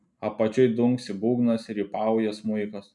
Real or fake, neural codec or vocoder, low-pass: real; none; 10.8 kHz